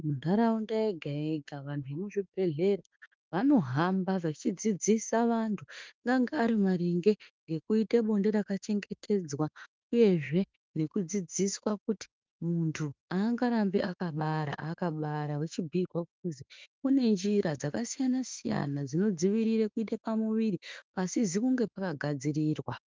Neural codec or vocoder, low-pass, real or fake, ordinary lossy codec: autoencoder, 48 kHz, 32 numbers a frame, DAC-VAE, trained on Japanese speech; 7.2 kHz; fake; Opus, 24 kbps